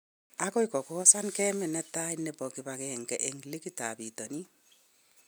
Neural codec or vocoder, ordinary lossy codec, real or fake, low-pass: none; none; real; none